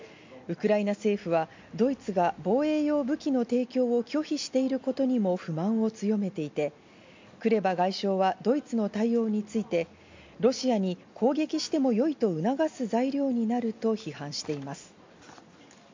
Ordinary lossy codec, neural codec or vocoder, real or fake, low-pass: MP3, 48 kbps; none; real; 7.2 kHz